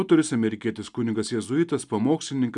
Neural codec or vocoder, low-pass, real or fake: none; 10.8 kHz; real